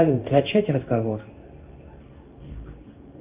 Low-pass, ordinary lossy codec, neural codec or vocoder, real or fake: 3.6 kHz; Opus, 24 kbps; codec, 16 kHz in and 24 kHz out, 1 kbps, XY-Tokenizer; fake